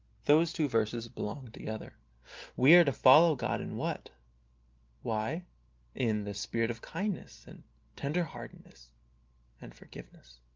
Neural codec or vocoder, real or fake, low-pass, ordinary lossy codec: none; real; 7.2 kHz; Opus, 32 kbps